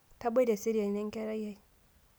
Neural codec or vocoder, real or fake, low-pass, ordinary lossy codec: none; real; none; none